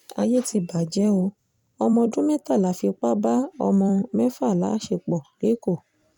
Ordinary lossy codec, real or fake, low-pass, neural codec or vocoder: none; fake; 19.8 kHz; vocoder, 44.1 kHz, 128 mel bands every 256 samples, BigVGAN v2